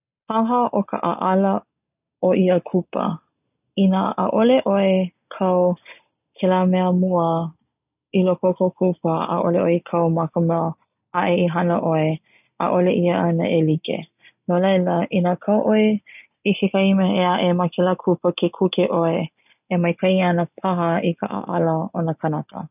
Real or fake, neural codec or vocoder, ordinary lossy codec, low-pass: real; none; none; 3.6 kHz